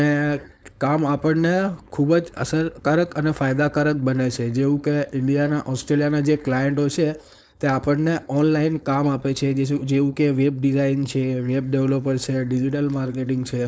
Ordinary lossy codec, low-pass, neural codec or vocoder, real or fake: none; none; codec, 16 kHz, 4.8 kbps, FACodec; fake